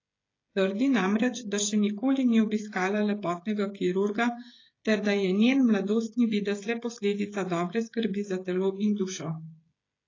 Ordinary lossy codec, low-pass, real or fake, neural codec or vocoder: AAC, 32 kbps; 7.2 kHz; fake; codec, 16 kHz, 16 kbps, FreqCodec, smaller model